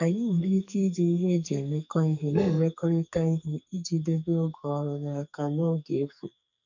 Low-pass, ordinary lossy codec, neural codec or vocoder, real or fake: 7.2 kHz; none; codec, 44.1 kHz, 2.6 kbps, SNAC; fake